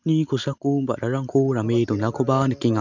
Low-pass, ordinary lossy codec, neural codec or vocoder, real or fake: 7.2 kHz; none; none; real